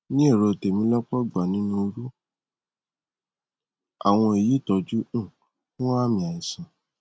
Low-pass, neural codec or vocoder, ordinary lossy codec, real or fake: none; none; none; real